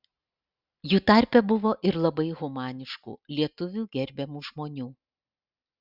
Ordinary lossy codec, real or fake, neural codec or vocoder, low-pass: Opus, 64 kbps; real; none; 5.4 kHz